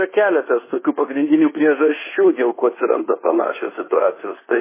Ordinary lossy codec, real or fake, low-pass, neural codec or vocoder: MP3, 16 kbps; fake; 3.6 kHz; codec, 24 kHz, 1.2 kbps, DualCodec